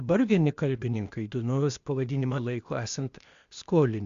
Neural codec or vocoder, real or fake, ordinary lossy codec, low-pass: codec, 16 kHz, 0.8 kbps, ZipCodec; fake; Opus, 64 kbps; 7.2 kHz